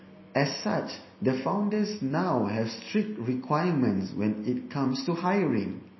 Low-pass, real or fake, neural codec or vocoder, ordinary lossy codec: 7.2 kHz; real; none; MP3, 24 kbps